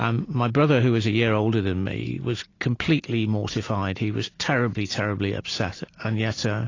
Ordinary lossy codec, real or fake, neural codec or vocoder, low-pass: AAC, 32 kbps; real; none; 7.2 kHz